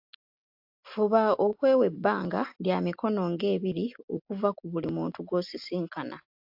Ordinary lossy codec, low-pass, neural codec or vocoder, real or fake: AAC, 48 kbps; 5.4 kHz; none; real